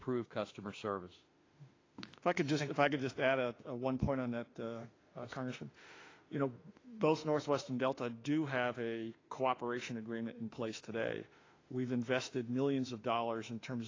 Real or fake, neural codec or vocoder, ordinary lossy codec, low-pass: fake; autoencoder, 48 kHz, 32 numbers a frame, DAC-VAE, trained on Japanese speech; AAC, 32 kbps; 7.2 kHz